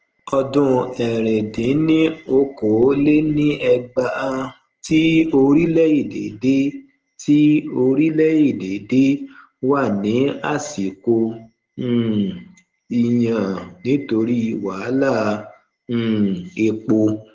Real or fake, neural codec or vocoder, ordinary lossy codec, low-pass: real; none; Opus, 16 kbps; 7.2 kHz